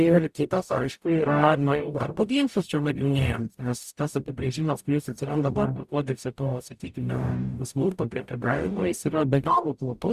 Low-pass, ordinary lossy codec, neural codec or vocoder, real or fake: 14.4 kHz; Opus, 64 kbps; codec, 44.1 kHz, 0.9 kbps, DAC; fake